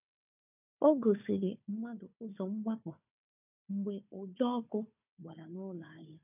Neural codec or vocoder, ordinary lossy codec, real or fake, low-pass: codec, 16 kHz, 4 kbps, FunCodec, trained on Chinese and English, 50 frames a second; none; fake; 3.6 kHz